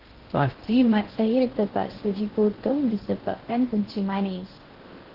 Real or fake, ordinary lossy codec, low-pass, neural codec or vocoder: fake; Opus, 16 kbps; 5.4 kHz; codec, 16 kHz in and 24 kHz out, 0.6 kbps, FocalCodec, streaming, 2048 codes